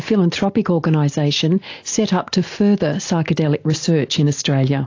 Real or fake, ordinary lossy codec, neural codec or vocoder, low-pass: real; AAC, 48 kbps; none; 7.2 kHz